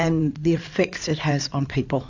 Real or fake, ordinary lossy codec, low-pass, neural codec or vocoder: fake; AAC, 48 kbps; 7.2 kHz; codec, 16 kHz in and 24 kHz out, 2.2 kbps, FireRedTTS-2 codec